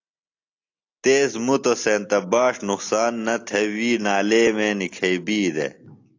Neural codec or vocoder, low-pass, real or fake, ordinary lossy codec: none; 7.2 kHz; real; AAC, 48 kbps